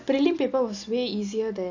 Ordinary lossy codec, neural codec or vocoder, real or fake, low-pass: AAC, 48 kbps; none; real; 7.2 kHz